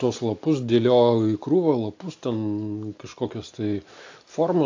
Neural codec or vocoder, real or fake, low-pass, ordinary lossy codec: none; real; 7.2 kHz; AAC, 48 kbps